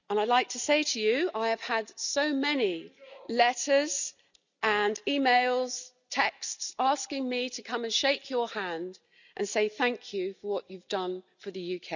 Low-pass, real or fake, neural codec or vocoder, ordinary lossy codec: 7.2 kHz; real; none; none